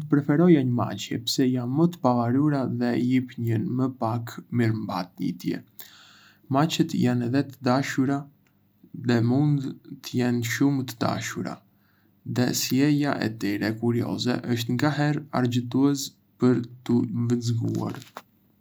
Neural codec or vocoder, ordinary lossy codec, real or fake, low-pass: none; none; real; none